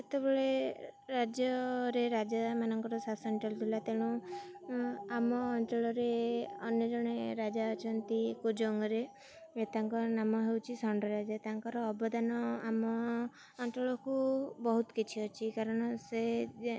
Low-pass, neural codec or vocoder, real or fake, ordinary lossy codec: none; none; real; none